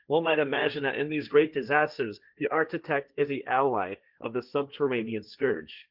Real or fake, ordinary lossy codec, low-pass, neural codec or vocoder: fake; Opus, 24 kbps; 5.4 kHz; codec, 16 kHz, 1.1 kbps, Voila-Tokenizer